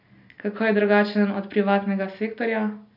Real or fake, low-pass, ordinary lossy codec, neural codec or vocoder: real; 5.4 kHz; none; none